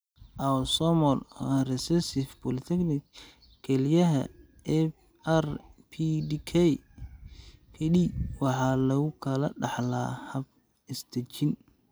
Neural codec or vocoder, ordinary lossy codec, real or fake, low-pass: none; none; real; none